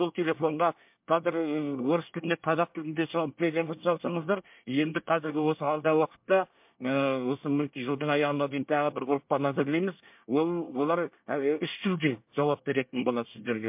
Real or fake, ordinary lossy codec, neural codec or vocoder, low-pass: fake; MP3, 32 kbps; codec, 24 kHz, 1 kbps, SNAC; 3.6 kHz